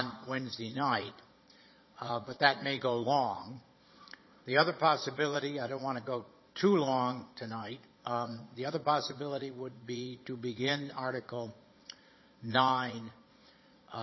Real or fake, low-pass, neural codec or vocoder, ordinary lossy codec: fake; 7.2 kHz; vocoder, 22.05 kHz, 80 mel bands, Vocos; MP3, 24 kbps